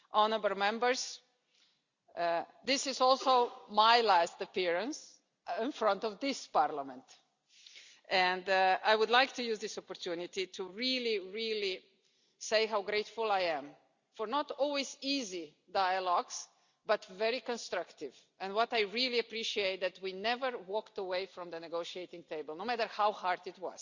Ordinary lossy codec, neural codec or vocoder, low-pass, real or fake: Opus, 64 kbps; none; 7.2 kHz; real